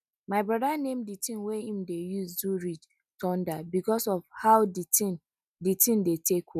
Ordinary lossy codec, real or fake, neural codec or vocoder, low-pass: none; real; none; 14.4 kHz